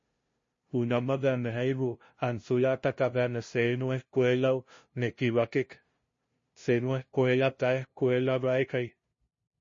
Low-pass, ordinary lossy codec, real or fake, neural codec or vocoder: 7.2 kHz; MP3, 32 kbps; fake; codec, 16 kHz, 0.5 kbps, FunCodec, trained on LibriTTS, 25 frames a second